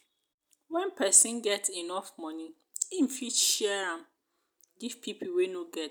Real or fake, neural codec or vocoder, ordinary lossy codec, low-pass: real; none; none; none